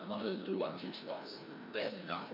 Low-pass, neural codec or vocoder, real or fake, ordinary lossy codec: 5.4 kHz; codec, 16 kHz, 1 kbps, FreqCodec, larger model; fake; MP3, 48 kbps